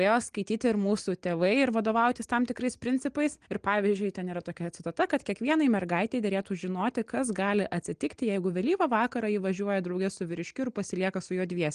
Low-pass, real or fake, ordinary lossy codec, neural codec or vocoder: 9.9 kHz; real; Opus, 24 kbps; none